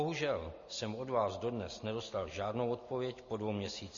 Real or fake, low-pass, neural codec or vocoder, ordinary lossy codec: real; 7.2 kHz; none; MP3, 32 kbps